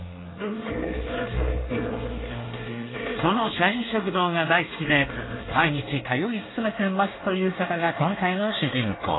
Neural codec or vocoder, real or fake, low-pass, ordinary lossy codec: codec, 24 kHz, 1 kbps, SNAC; fake; 7.2 kHz; AAC, 16 kbps